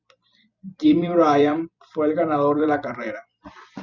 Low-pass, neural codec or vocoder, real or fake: 7.2 kHz; vocoder, 44.1 kHz, 128 mel bands every 256 samples, BigVGAN v2; fake